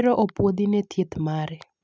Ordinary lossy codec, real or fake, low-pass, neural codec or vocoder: none; real; none; none